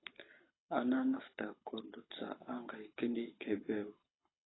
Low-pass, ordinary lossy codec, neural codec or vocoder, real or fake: 7.2 kHz; AAC, 16 kbps; codec, 24 kHz, 6 kbps, HILCodec; fake